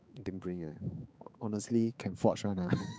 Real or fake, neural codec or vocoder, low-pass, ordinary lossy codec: fake; codec, 16 kHz, 4 kbps, X-Codec, HuBERT features, trained on balanced general audio; none; none